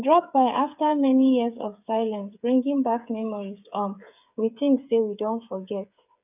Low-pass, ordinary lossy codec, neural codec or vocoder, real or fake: 3.6 kHz; none; codec, 16 kHz, 8 kbps, FreqCodec, smaller model; fake